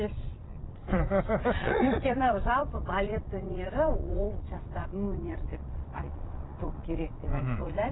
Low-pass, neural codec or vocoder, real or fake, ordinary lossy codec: 7.2 kHz; vocoder, 22.05 kHz, 80 mel bands, Vocos; fake; AAC, 16 kbps